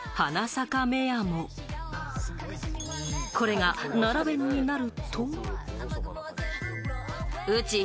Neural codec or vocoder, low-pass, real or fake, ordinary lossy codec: none; none; real; none